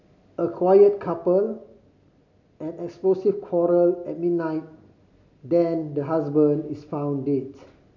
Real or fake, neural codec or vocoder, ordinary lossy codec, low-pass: real; none; none; 7.2 kHz